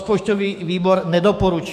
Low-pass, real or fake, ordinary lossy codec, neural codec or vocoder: 14.4 kHz; fake; AAC, 96 kbps; autoencoder, 48 kHz, 128 numbers a frame, DAC-VAE, trained on Japanese speech